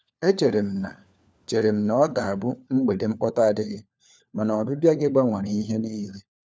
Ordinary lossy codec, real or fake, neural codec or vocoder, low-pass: none; fake; codec, 16 kHz, 4 kbps, FunCodec, trained on LibriTTS, 50 frames a second; none